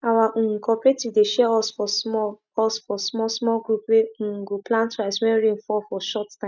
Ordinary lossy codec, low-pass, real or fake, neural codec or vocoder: none; 7.2 kHz; real; none